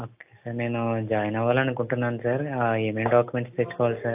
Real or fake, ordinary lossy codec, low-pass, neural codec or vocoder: real; AAC, 32 kbps; 3.6 kHz; none